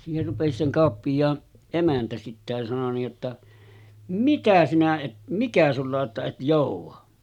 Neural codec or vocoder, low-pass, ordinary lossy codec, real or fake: none; 19.8 kHz; none; real